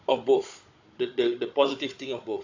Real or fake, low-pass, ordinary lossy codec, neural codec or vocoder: fake; 7.2 kHz; AAC, 48 kbps; codec, 16 kHz, 16 kbps, FunCodec, trained on Chinese and English, 50 frames a second